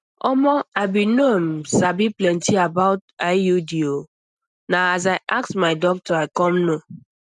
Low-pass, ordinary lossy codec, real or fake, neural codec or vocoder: 10.8 kHz; none; real; none